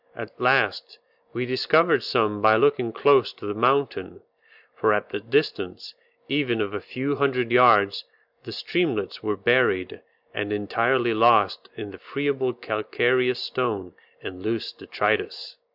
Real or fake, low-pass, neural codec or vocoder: real; 5.4 kHz; none